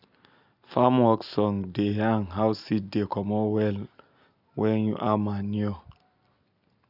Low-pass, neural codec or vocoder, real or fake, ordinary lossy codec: 5.4 kHz; none; real; none